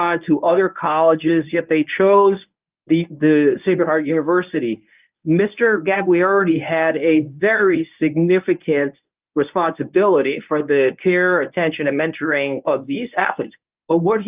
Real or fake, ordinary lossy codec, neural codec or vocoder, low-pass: fake; Opus, 24 kbps; codec, 24 kHz, 0.9 kbps, WavTokenizer, medium speech release version 1; 3.6 kHz